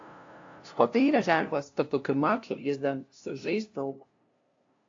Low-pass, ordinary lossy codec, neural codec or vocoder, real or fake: 7.2 kHz; AAC, 48 kbps; codec, 16 kHz, 0.5 kbps, FunCodec, trained on LibriTTS, 25 frames a second; fake